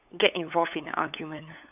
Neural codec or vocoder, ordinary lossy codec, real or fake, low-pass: codec, 16 kHz, 8 kbps, FunCodec, trained on LibriTTS, 25 frames a second; none; fake; 3.6 kHz